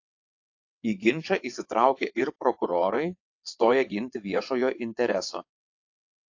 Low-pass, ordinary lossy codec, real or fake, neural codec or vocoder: 7.2 kHz; AAC, 48 kbps; fake; vocoder, 22.05 kHz, 80 mel bands, WaveNeXt